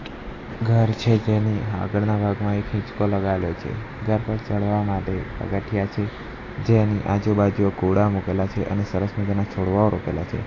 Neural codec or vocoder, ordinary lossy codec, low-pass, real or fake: none; AAC, 32 kbps; 7.2 kHz; real